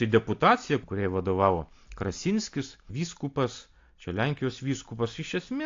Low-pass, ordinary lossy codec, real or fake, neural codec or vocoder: 7.2 kHz; AAC, 48 kbps; real; none